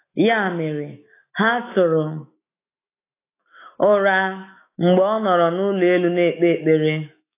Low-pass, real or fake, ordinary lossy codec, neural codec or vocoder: 3.6 kHz; fake; AAC, 24 kbps; autoencoder, 48 kHz, 128 numbers a frame, DAC-VAE, trained on Japanese speech